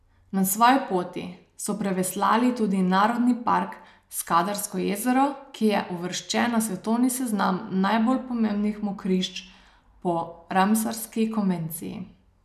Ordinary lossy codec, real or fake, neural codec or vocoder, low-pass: none; real; none; 14.4 kHz